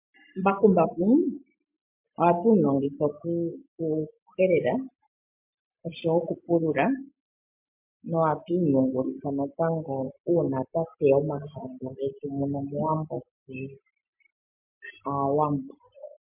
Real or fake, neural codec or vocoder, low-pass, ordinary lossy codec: real; none; 3.6 kHz; MP3, 32 kbps